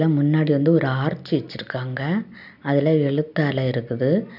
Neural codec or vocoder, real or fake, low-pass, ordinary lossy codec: none; real; 5.4 kHz; none